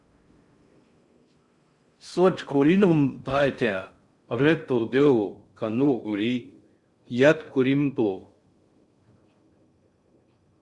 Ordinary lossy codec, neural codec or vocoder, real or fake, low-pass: Opus, 64 kbps; codec, 16 kHz in and 24 kHz out, 0.6 kbps, FocalCodec, streaming, 4096 codes; fake; 10.8 kHz